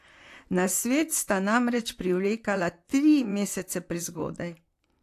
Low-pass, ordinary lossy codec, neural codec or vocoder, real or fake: 14.4 kHz; AAC, 64 kbps; vocoder, 44.1 kHz, 128 mel bands, Pupu-Vocoder; fake